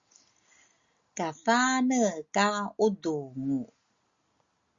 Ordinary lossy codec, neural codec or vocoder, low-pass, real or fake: Opus, 64 kbps; none; 7.2 kHz; real